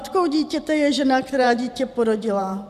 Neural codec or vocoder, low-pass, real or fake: vocoder, 44.1 kHz, 128 mel bands every 512 samples, BigVGAN v2; 14.4 kHz; fake